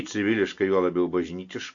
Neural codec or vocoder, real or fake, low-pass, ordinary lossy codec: none; real; 7.2 kHz; AAC, 48 kbps